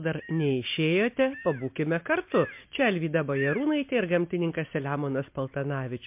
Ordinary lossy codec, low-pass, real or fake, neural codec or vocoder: MP3, 32 kbps; 3.6 kHz; real; none